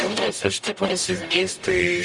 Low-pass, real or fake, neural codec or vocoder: 10.8 kHz; fake; codec, 44.1 kHz, 0.9 kbps, DAC